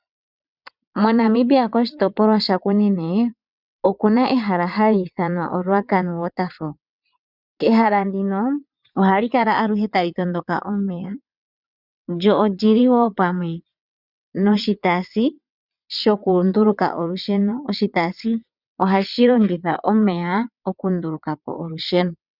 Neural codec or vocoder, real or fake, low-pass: vocoder, 22.05 kHz, 80 mel bands, WaveNeXt; fake; 5.4 kHz